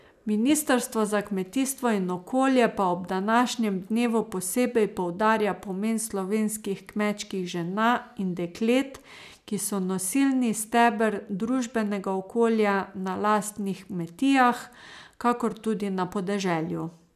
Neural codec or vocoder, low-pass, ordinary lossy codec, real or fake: none; 14.4 kHz; none; real